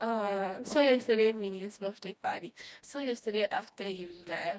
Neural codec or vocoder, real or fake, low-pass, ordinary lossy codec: codec, 16 kHz, 1 kbps, FreqCodec, smaller model; fake; none; none